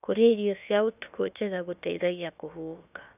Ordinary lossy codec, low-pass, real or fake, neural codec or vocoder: none; 3.6 kHz; fake; codec, 16 kHz, about 1 kbps, DyCAST, with the encoder's durations